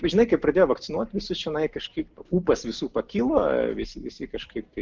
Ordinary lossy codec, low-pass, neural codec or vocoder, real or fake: Opus, 24 kbps; 7.2 kHz; none; real